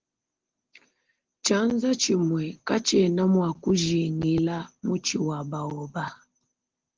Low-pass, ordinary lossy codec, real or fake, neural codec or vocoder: 7.2 kHz; Opus, 16 kbps; real; none